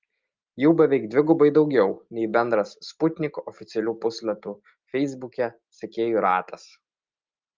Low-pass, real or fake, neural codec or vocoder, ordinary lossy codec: 7.2 kHz; real; none; Opus, 32 kbps